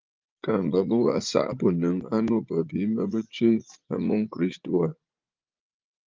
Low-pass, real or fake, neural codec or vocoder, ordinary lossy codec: 7.2 kHz; fake; vocoder, 44.1 kHz, 80 mel bands, Vocos; Opus, 24 kbps